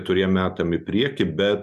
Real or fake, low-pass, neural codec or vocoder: real; 14.4 kHz; none